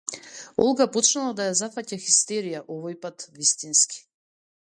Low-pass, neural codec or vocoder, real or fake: 9.9 kHz; none; real